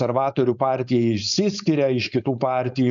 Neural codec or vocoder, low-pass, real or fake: codec, 16 kHz, 4.8 kbps, FACodec; 7.2 kHz; fake